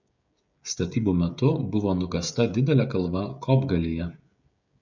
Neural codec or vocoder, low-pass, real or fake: codec, 16 kHz, 16 kbps, FreqCodec, smaller model; 7.2 kHz; fake